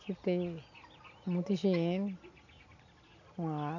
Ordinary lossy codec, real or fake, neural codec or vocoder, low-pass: none; real; none; 7.2 kHz